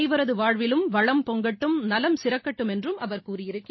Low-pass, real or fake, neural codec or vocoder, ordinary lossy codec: 7.2 kHz; real; none; MP3, 24 kbps